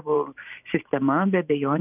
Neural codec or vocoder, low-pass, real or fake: vocoder, 44.1 kHz, 128 mel bands every 512 samples, BigVGAN v2; 3.6 kHz; fake